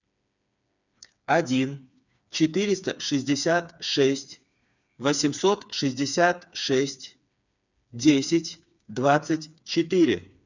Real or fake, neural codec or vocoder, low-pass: fake; codec, 16 kHz, 4 kbps, FreqCodec, smaller model; 7.2 kHz